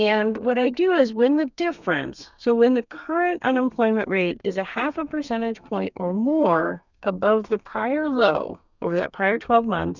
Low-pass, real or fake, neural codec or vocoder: 7.2 kHz; fake; codec, 32 kHz, 1.9 kbps, SNAC